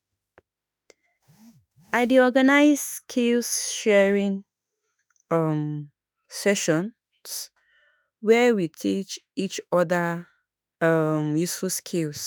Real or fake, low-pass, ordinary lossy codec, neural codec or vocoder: fake; none; none; autoencoder, 48 kHz, 32 numbers a frame, DAC-VAE, trained on Japanese speech